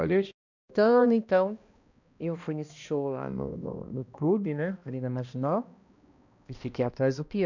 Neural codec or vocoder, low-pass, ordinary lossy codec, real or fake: codec, 16 kHz, 1 kbps, X-Codec, HuBERT features, trained on balanced general audio; 7.2 kHz; none; fake